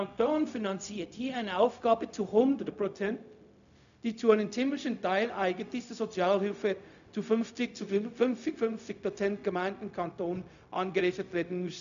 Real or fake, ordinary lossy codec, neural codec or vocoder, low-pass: fake; none; codec, 16 kHz, 0.4 kbps, LongCat-Audio-Codec; 7.2 kHz